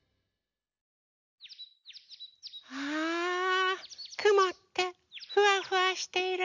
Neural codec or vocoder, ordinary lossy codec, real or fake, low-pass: none; none; real; 7.2 kHz